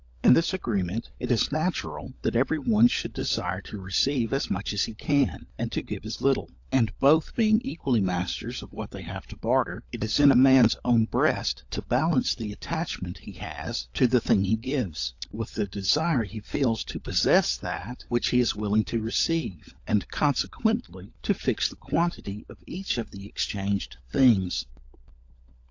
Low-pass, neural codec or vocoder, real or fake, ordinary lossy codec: 7.2 kHz; codec, 16 kHz, 16 kbps, FunCodec, trained on LibriTTS, 50 frames a second; fake; AAC, 48 kbps